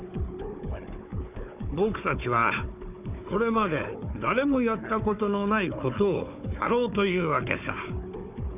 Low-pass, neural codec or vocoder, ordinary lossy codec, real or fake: 3.6 kHz; codec, 16 kHz, 4 kbps, FunCodec, trained on Chinese and English, 50 frames a second; none; fake